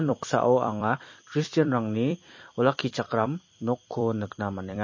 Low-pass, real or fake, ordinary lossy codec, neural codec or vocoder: 7.2 kHz; fake; MP3, 32 kbps; vocoder, 44.1 kHz, 128 mel bands every 256 samples, BigVGAN v2